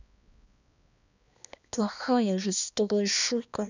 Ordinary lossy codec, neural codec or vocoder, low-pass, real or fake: none; codec, 16 kHz, 2 kbps, X-Codec, HuBERT features, trained on balanced general audio; 7.2 kHz; fake